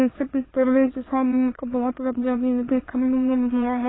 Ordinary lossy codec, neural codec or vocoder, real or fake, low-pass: AAC, 16 kbps; autoencoder, 22.05 kHz, a latent of 192 numbers a frame, VITS, trained on many speakers; fake; 7.2 kHz